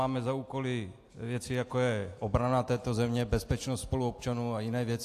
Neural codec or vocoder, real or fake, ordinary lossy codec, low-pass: none; real; AAC, 64 kbps; 14.4 kHz